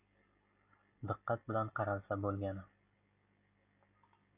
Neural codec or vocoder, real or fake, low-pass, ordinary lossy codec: none; real; 3.6 kHz; AAC, 32 kbps